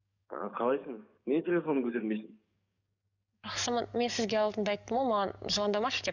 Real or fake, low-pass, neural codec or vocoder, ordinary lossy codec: fake; 7.2 kHz; codec, 44.1 kHz, 7.8 kbps, DAC; none